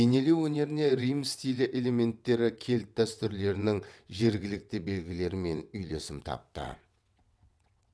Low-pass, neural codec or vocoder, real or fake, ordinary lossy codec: none; vocoder, 22.05 kHz, 80 mel bands, WaveNeXt; fake; none